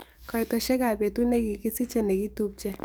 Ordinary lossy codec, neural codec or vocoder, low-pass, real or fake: none; codec, 44.1 kHz, 7.8 kbps, DAC; none; fake